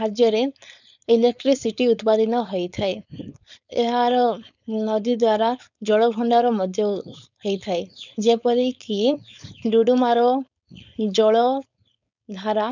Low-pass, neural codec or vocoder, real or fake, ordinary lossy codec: 7.2 kHz; codec, 16 kHz, 4.8 kbps, FACodec; fake; none